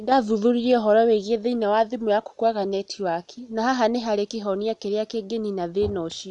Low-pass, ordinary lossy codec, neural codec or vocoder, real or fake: 10.8 kHz; none; none; real